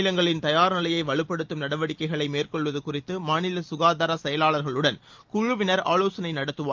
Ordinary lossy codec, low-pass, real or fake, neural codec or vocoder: Opus, 24 kbps; 7.2 kHz; real; none